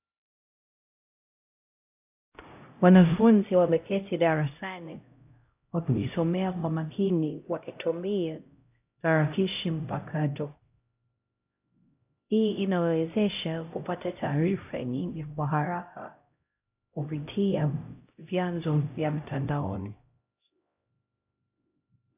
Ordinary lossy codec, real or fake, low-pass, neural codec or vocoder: AAC, 32 kbps; fake; 3.6 kHz; codec, 16 kHz, 0.5 kbps, X-Codec, HuBERT features, trained on LibriSpeech